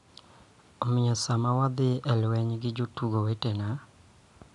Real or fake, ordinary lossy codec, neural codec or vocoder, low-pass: real; none; none; 10.8 kHz